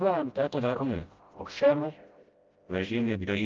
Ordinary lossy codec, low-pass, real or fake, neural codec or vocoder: Opus, 32 kbps; 7.2 kHz; fake; codec, 16 kHz, 0.5 kbps, FreqCodec, smaller model